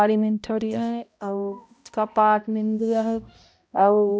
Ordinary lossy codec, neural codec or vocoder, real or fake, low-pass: none; codec, 16 kHz, 0.5 kbps, X-Codec, HuBERT features, trained on balanced general audio; fake; none